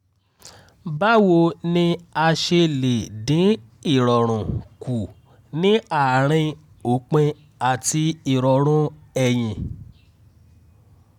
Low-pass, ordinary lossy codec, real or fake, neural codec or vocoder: 19.8 kHz; none; real; none